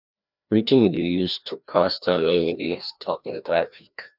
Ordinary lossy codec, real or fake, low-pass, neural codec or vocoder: none; fake; 5.4 kHz; codec, 16 kHz, 1 kbps, FreqCodec, larger model